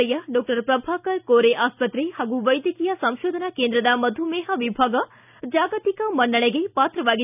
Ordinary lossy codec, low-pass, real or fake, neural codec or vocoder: none; 3.6 kHz; real; none